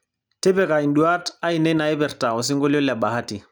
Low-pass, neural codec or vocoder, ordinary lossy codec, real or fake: none; none; none; real